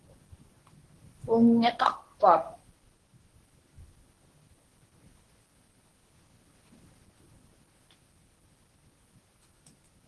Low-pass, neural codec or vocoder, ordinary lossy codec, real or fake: 10.8 kHz; codec, 24 kHz, 0.9 kbps, WavTokenizer, medium speech release version 1; Opus, 16 kbps; fake